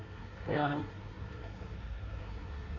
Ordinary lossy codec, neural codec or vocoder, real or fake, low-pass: AAC, 32 kbps; codec, 24 kHz, 1 kbps, SNAC; fake; 7.2 kHz